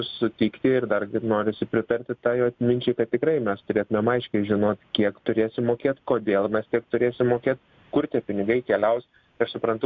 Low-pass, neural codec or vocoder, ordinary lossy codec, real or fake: 7.2 kHz; none; MP3, 64 kbps; real